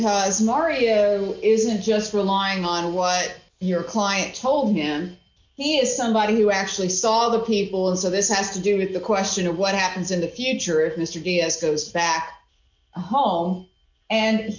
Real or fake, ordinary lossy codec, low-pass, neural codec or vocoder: real; MP3, 48 kbps; 7.2 kHz; none